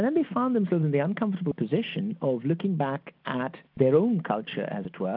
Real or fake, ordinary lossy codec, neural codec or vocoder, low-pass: real; AAC, 48 kbps; none; 5.4 kHz